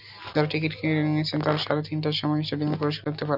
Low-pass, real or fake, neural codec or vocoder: 5.4 kHz; real; none